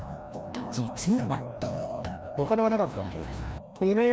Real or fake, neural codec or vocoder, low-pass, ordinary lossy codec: fake; codec, 16 kHz, 1 kbps, FreqCodec, larger model; none; none